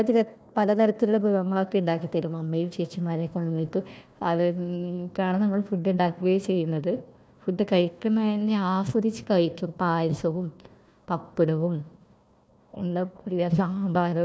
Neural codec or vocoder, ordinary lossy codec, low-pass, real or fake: codec, 16 kHz, 1 kbps, FunCodec, trained on Chinese and English, 50 frames a second; none; none; fake